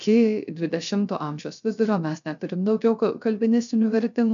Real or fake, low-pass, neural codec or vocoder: fake; 7.2 kHz; codec, 16 kHz, 0.3 kbps, FocalCodec